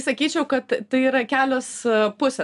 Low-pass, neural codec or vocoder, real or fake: 10.8 kHz; none; real